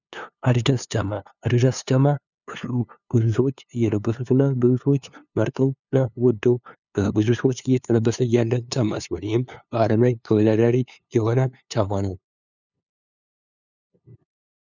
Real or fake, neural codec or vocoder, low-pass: fake; codec, 16 kHz, 2 kbps, FunCodec, trained on LibriTTS, 25 frames a second; 7.2 kHz